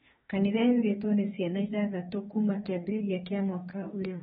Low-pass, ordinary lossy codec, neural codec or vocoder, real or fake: 19.8 kHz; AAC, 16 kbps; autoencoder, 48 kHz, 32 numbers a frame, DAC-VAE, trained on Japanese speech; fake